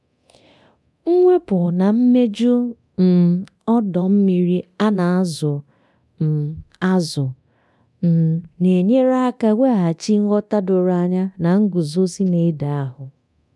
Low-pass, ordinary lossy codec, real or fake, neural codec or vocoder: none; none; fake; codec, 24 kHz, 0.9 kbps, DualCodec